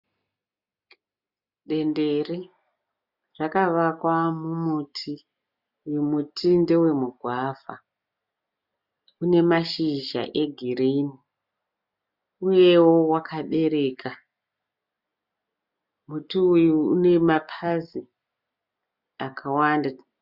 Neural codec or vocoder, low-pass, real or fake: none; 5.4 kHz; real